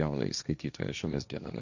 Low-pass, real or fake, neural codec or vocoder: 7.2 kHz; fake; codec, 16 kHz, 1.1 kbps, Voila-Tokenizer